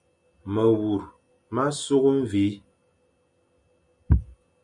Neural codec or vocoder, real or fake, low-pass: none; real; 10.8 kHz